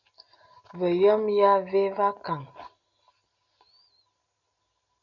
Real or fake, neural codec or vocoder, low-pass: real; none; 7.2 kHz